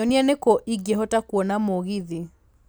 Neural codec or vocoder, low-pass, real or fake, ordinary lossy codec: none; none; real; none